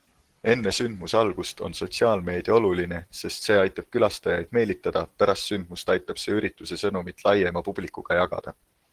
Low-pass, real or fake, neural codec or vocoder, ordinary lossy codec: 14.4 kHz; real; none; Opus, 16 kbps